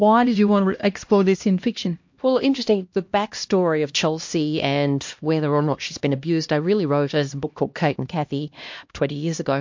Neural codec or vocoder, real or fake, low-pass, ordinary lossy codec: codec, 16 kHz, 1 kbps, X-Codec, HuBERT features, trained on LibriSpeech; fake; 7.2 kHz; MP3, 48 kbps